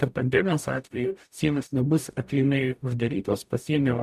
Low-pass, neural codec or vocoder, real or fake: 14.4 kHz; codec, 44.1 kHz, 0.9 kbps, DAC; fake